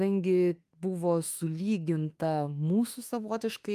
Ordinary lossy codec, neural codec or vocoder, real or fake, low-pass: Opus, 32 kbps; autoencoder, 48 kHz, 32 numbers a frame, DAC-VAE, trained on Japanese speech; fake; 14.4 kHz